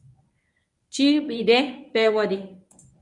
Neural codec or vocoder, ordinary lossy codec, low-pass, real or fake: codec, 24 kHz, 0.9 kbps, WavTokenizer, medium speech release version 1; MP3, 96 kbps; 10.8 kHz; fake